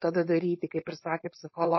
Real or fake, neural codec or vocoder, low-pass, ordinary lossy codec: fake; codec, 16 kHz, 4.8 kbps, FACodec; 7.2 kHz; MP3, 24 kbps